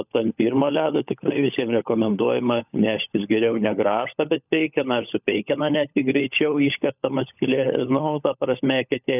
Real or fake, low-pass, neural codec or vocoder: fake; 3.6 kHz; codec, 16 kHz, 16 kbps, FunCodec, trained on LibriTTS, 50 frames a second